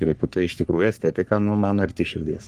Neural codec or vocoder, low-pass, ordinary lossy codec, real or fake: codec, 44.1 kHz, 3.4 kbps, Pupu-Codec; 14.4 kHz; Opus, 32 kbps; fake